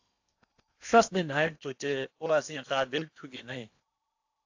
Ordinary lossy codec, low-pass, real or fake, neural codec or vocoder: AAC, 48 kbps; 7.2 kHz; fake; codec, 16 kHz in and 24 kHz out, 0.8 kbps, FocalCodec, streaming, 65536 codes